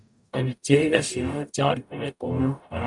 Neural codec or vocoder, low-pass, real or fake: codec, 44.1 kHz, 0.9 kbps, DAC; 10.8 kHz; fake